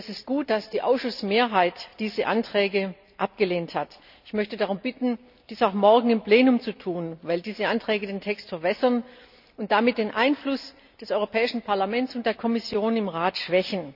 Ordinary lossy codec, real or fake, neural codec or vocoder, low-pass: none; real; none; 5.4 kHz